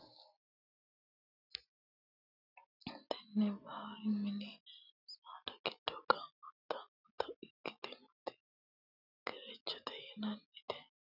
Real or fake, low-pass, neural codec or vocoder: real; 5.4 kHz; none